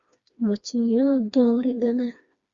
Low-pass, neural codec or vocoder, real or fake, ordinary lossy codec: 7.2 kHz; codec, 16 kHz, 2 kbps, FreqCodec, smaller model; fake; Opus, 64 kbps